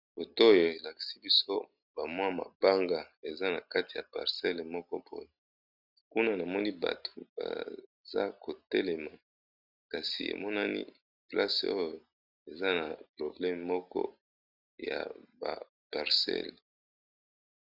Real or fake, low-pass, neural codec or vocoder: real; 5.4 kHz; none